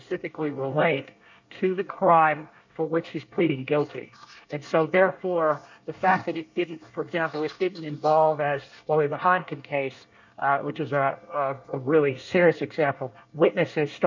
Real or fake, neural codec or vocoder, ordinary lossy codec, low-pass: fake; codec, 24 kHz, 1 kbps, SNAC; MP3, 48 kbps; 7.2 kHz